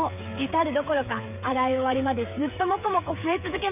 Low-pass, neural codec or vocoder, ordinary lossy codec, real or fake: 3.6 kHz; codec, 16 kHz, 8 kbps, FreqCodec, smaller model; none; fake